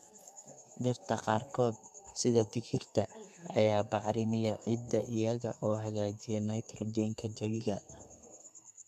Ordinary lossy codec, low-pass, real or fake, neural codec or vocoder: none; 14.4 kHz; fake; codec, 32 kHz, 1.9 kbps, SNAC